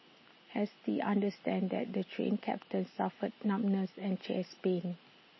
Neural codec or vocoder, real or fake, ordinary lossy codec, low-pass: none; real; MP3, 24 kbps; 7.2 kHz